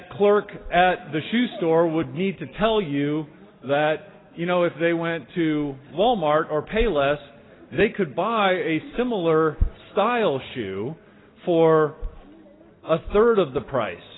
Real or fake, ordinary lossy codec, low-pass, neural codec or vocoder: real; AAC, 16 kbps; 7.2 kHz; none